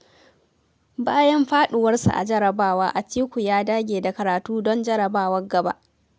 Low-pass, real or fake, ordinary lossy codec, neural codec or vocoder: none; real; none; none